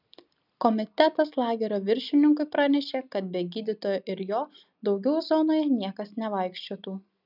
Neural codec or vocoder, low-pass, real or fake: none; 5.4 kHz; real